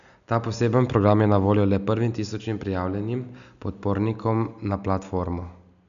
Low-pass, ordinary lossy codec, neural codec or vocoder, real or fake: 7.2 kHz; none; none; real